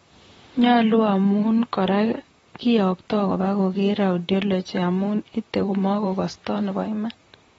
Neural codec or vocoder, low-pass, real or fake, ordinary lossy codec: vocoder, 44.1 kHz, 128 mel bands, Pupu-Vocoder; 19.8 kHz; fake; AAC, 24 kbps